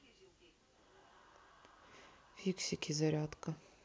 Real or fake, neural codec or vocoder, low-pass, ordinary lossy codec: real; none; none; none